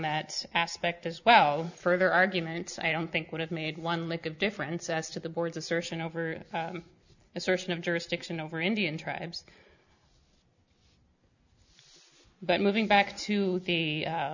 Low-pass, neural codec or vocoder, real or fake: 7.2 kHz; none; real